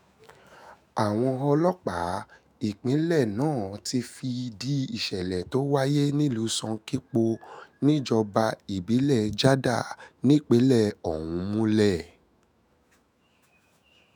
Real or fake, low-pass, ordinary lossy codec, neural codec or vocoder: fake; none; none; autoencoder, 48 kHz, 128 numbers a frame, DAC-VAE, trained on Japanese speech